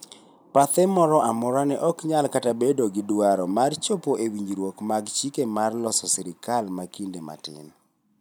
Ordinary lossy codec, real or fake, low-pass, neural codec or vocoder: none; real; none; none